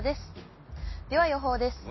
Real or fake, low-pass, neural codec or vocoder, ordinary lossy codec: real; 7.2 kHz; none; MP3, 24 kbps